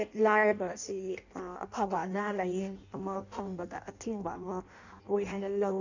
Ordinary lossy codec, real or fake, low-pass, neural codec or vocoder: none; fake; 7.2 kHz; codec, 16 kHz in and 24 kHz out, 0.6 kbps, FireRedTTS-2 codec